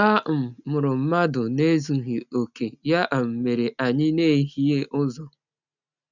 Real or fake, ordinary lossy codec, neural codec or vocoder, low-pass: real; none; none; 7.2 kHz